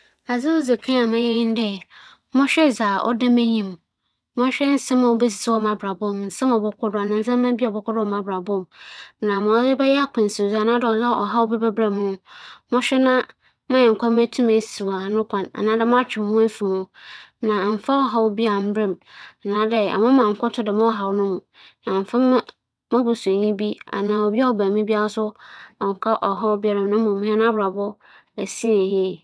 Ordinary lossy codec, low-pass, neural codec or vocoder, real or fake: none; none; vocoder, 22.05 kHz, 80 mel bands, WaveNeXt; fake